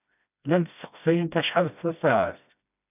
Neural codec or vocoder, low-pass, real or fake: codec, 16 kHz, 1 kbps, FreqCodec, smaller model; 3.6 kHz; fake